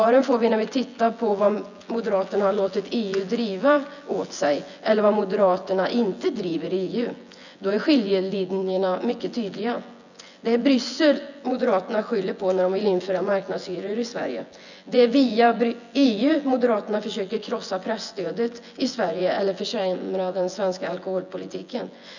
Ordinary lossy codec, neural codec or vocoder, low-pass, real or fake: none; vocoder, 24 kHz, 100 mel bands, Vocos; 7.2 kHz; fake